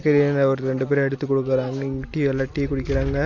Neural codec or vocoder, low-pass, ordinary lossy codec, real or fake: none; 7.2 kHz; none; real